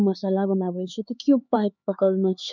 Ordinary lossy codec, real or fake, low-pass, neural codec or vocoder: none; fake; 7.2 kHz; codec, 16 kHz, 4 kbps, X-Codec, HuBERT features, trained on LibriSpeech